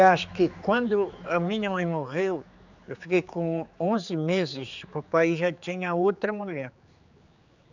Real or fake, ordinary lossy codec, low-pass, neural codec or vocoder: fake; none; 7.2 kHz; codec, 16 kHz, 4 kbps, X-Codec, HuBERT features, trained on general audio